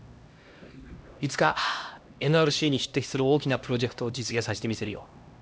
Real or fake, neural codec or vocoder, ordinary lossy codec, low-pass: fake; codec, 16 kHz, 1 kbps, X-Codec, HuBERT features, trained on LibriSpeech; none; none